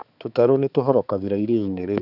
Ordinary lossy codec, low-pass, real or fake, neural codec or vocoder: none; 5.4 kHz; fake; codec, 16 kHz, 4 kbps, X-Codec, HuBERT features, trained on balanced general audio